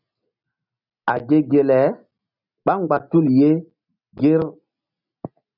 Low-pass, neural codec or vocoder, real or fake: 5.4 kHz; none; real